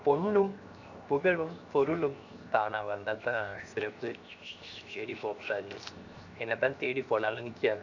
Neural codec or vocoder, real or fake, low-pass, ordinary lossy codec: codec, 16 kHz, 0.7 kbps, FocalCodec; fake; 7.2 kHz; none